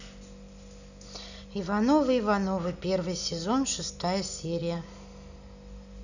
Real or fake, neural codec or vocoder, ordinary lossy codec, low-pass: real; none; none; 7.2 kHz